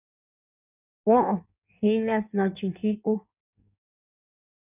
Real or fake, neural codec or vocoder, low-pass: fake; codec, 16 kHz in and 24 kHz out, 1.1 kbps, FireRedTTS-2 codec; 3.6 kHz